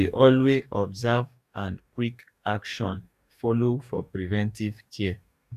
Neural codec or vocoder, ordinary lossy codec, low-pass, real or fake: codec, 44.1 kHz, 2.6 kbps, DAC; none; 14.4 kHz; fake